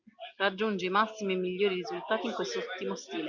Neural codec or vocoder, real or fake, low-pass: none; real; 7.2 kHz